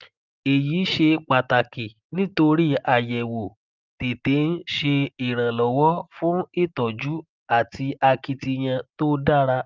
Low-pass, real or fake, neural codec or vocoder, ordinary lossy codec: 7.2 kHz; real; none; Opus, 24 kbps